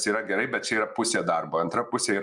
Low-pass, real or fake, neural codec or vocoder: 10.8 kHz; real; none